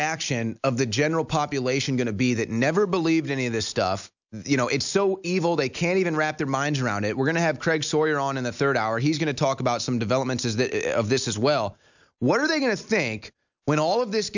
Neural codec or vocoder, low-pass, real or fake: none; 7.2 kHz; real